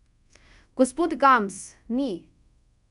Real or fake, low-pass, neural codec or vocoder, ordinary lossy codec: fake; 10.8 kHz; codec, 24 kHz, 0.5 kbps, DualCodec; none